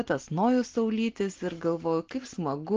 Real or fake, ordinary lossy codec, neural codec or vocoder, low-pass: real; Opus, 16 kbps; none; 7.2 kHz